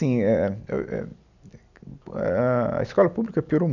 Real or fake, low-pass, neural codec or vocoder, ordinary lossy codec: real; 7.2 kHz; none; none